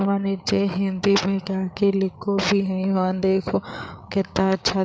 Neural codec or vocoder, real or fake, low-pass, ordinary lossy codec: codec, 16 kHz, 8 kbps, FreqCodec, larger model; fake; none; none